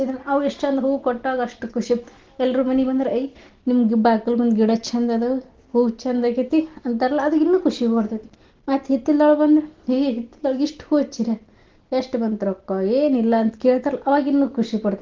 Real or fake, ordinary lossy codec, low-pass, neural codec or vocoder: real; Opus, 16 kbps; 7.2 kHz; none